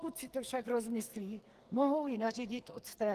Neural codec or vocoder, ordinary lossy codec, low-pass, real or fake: codec, 44.1 kHz, 2.6 kbps, SNAC; Opus, 24 kbps; 14.4 kHz; fake